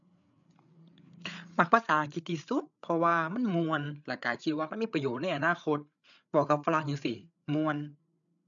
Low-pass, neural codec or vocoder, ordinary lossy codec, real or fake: 7.2 kHz; codec, 16 kHz, 8 kbps, FreqCodec, larger model; none; fake